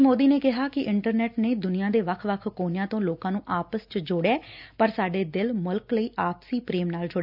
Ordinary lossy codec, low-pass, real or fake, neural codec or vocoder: none; 5.4 kHz; real; none